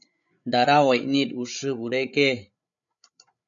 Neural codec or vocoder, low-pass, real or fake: codec, 16 kHz, 8 kbps, FreqCodec, larger model; 7.2 kHz; fake